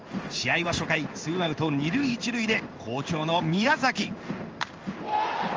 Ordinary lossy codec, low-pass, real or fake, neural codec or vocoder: Opus, 24 kbps; 7.2 kHz; fake; codec, 16 kHz in and 24 kHz out, 1 kbps, XY-Tokenizer